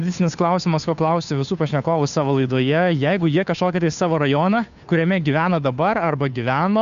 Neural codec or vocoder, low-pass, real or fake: codec, 16 kHz, 2 kbps, FunCodec, trained on Chinese and English, 25 frames a second; 7.2 kHz; fake